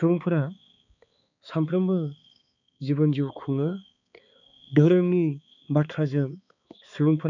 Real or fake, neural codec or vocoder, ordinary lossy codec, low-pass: fake; codec, 16 kHz, 4 kbps, X-Codec, HuBERT features, trained on balanced general audio; none; 7.2 kHz